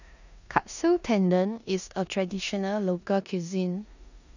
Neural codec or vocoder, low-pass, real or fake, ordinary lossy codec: codec, 16 kHz in and 24 kHz out, 0.9 kbps, LongCat-Audio-Codec, four codebook decoder; 7.2 kHz; fake; none